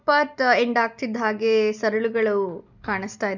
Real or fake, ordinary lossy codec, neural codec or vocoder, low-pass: real; none; none; 7.2 kHz